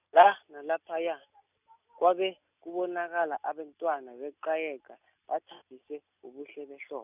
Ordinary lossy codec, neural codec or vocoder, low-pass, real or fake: none; none; 3.6 kHz; real